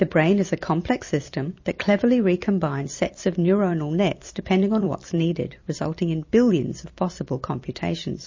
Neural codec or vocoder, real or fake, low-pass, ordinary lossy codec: vocoder, 44.1 kHz, 128 mel bands every 256 samples, BigVGAN v2; fake; 7.2 kHz; MP3, 32 kbps